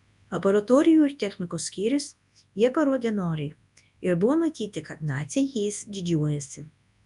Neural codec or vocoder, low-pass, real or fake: codec, 24 kHz, 0.9 kbps, WavTokenizer, large speech release; 10.8 kHz; fake